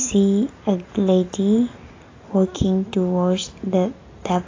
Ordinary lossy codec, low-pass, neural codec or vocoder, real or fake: AAC, 32 kbps; 7.2 kHz; none; real